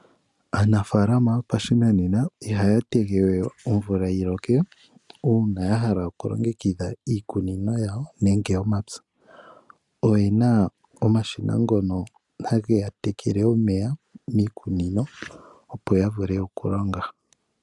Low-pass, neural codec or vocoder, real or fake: 10.8 kHz; none; real